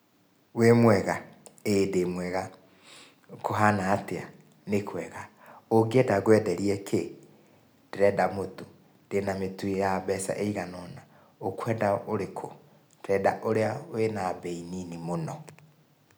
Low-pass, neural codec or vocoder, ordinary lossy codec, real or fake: none; none; none; real